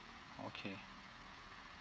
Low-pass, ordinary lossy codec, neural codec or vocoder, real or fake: none; none; codec, 16 kHz, 16 kbps, FreqCodec, smaller model; fake